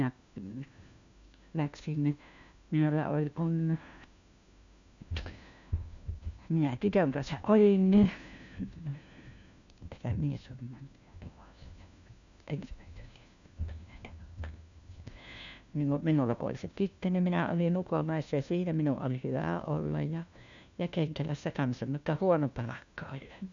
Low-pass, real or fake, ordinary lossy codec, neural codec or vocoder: 7.2 kHz; fake; none; codec, 16 kHz, 1 kbps, FunCodec, trained on LibriTTS, 50 frames a second